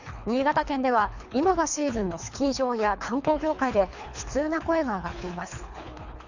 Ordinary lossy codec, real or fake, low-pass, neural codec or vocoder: none; fake; 7.2 kHz; codec, 24 kHz, 3 kbps, HILCodec